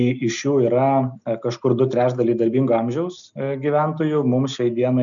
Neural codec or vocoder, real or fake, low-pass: none; real; 7.2 kHz